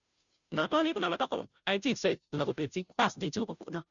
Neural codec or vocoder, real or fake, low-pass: codec, 16 kHz, 0.5 kbps, FunCodec, trained on Chinese and English, 25 frames a second; fake; 7.2 kHz